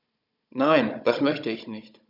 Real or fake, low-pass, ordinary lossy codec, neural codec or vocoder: fake; 5.4 kHz; none; codec, 16 kHz, 16 kbps, FunCodec, trained on Chinese and English, 50 frames a second